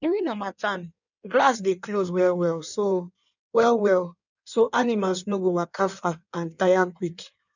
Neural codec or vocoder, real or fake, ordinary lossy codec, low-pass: codec, 16 kHz in and 24 kHz out, 1.1 kbps, FireRedTTS-2 codec; fake; none; 7.2 kHz